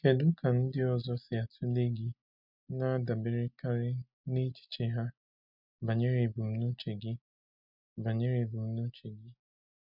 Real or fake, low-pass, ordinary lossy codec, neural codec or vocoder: real; 5.4 kHz; none; none